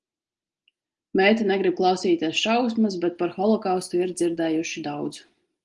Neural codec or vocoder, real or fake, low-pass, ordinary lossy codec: none; real; 10.8 kHz; Opus, 24 kbps